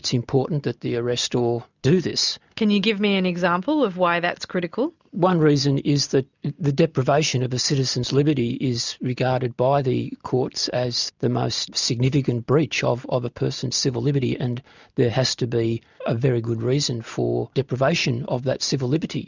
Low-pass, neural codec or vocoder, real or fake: 7.2 kHz; none; real